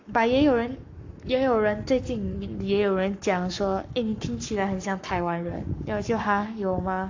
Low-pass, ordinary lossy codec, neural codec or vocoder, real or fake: 7.2 kHz; AAC, 48 kbps; codec, 44.1 kHz, 7.8 kbps, Pupu-Codec; fake